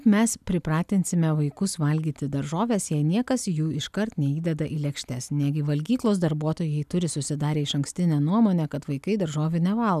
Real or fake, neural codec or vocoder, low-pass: real; none; 14.4 kHz